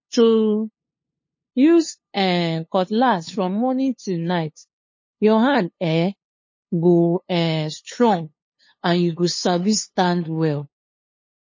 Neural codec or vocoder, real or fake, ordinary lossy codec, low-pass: codec, 16 kHz, 2 kbps, FunCodec, trained on LibriTTS, 25 frames a second; fake; MP3, 32 kbps; 7.2 kHz